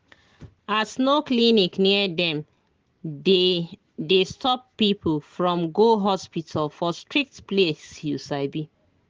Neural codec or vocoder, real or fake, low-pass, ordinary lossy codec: none; real; 7.2 kHz; Opus, 16 kbps